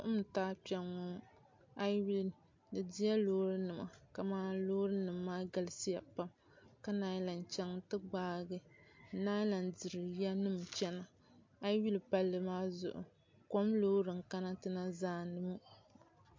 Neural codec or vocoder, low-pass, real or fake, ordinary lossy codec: none; 7.2 kHz; real; MP3, 48 kbps